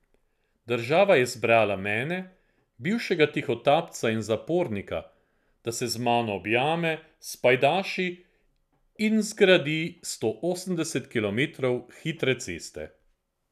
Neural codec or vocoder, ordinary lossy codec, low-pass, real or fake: none; none; 14.4 kHz; real